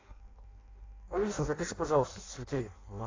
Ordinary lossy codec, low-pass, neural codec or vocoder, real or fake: AAC, 32 kbps; 7.2 kHz; codec, 16 kHz in and 24 kHz out, 0.6 kbps, FireRedTTS-2 codec; fake